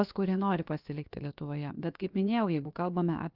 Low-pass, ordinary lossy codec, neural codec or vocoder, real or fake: 5.4 kHz; Opus, 24 kbps; codec, 16 kHz, about 1 kbps, DyCAST, with the encoder's durations; fake